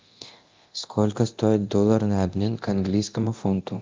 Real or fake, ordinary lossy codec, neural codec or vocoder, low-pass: fake; Opus, 32 kbps; codec, 24 kHz, 0.9 kbps, DualCodec; 7.2 kHz